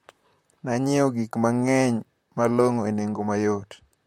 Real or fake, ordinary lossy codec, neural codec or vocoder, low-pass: fake; MP3, 64 kbps; vocoder, 44.1 kHz, 128 mel bands, Pupu-Vocoder; 19.8 kHz